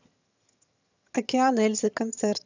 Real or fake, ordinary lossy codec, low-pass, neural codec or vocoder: fake; none; 7.2 kHz; vocoder, 22.05 kHz, 80 mel bands, HiFi-GAN